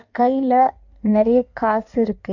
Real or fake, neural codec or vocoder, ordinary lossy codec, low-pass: fake; codec, 16 kHz in and 24 kHz out, 1.1 kbps, FireRedTTS-2 codec; none; 7.2 kHz